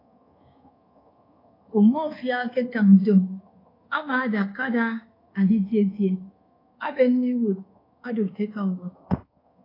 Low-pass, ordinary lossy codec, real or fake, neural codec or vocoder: 5.4 kHz; AAC, 24 kbps; fake; codec, 24 kHz, 1.2 kbps, DualCodec